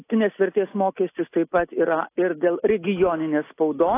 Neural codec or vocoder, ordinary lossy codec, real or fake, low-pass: none; AAC, 24 kbps; real; 3.6 kHz